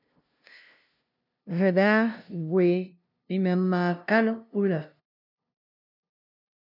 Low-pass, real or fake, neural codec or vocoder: 5.4 kHz; fake; codec, 16 kHz, 0.5 kbps, FunCodec, trained on LibriTTS, 25 frames a second